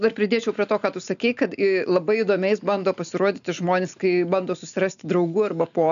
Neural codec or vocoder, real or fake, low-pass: none; real; 7.2 kHz